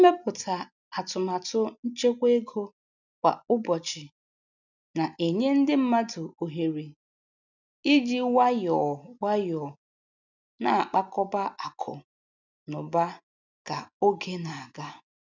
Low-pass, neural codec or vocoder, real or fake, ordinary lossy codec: 7.2 kHz; none; real; none